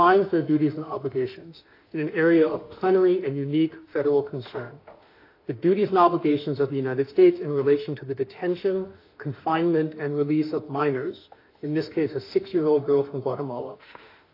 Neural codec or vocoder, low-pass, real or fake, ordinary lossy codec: autoencoder, 48 kHz, 32 numbers a frame, DAC-VAE, trained on Japanese speech; 5.4 kHz; fake; AAC, 32 kbps